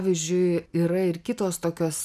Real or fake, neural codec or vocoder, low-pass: real; none; 14.4 kHz